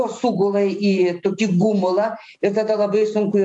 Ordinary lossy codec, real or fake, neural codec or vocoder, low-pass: AAC, 64 kbps; fake; vocoder, 44.1 kHz, 128 mel bands every 512 samples, BigVGAN v2; 10.8 kHz